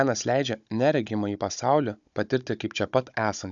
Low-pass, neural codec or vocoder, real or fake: 7.2 kHz; codec, 16 kHz, 16 kbps, FunCodec, trained on Chinese and English, 50 frames a second; fake